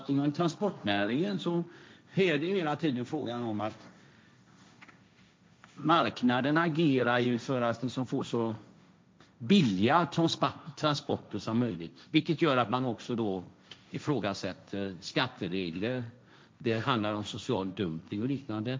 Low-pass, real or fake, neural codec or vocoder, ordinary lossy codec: none; fake; codec, 16 kHz, 1.1 kbps, Voila-Tokenizer; none